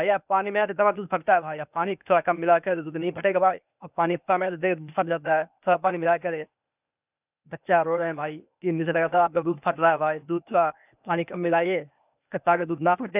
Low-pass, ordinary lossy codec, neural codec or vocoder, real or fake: 3.6 kHz; none; codec, 16 kHz, 0.8 kbps, ZipCodec; fake